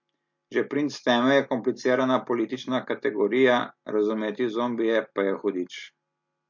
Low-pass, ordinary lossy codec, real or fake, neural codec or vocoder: 7.2 kHz; MP3, 48 kbps; real; none